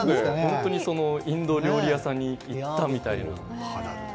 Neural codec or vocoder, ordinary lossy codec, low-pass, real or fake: none; none; none; real